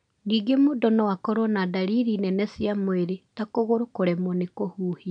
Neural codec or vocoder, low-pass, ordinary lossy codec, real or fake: none; 9.9 kHz; none; real